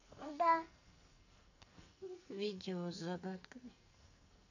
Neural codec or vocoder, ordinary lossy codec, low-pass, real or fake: codec, 44.1 kHz, 2.6 kbps, SNAC; none; 7.2 kHz; fake